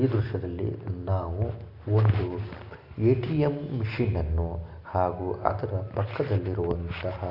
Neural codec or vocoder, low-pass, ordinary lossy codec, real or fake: none; 5.4 kHz; none; real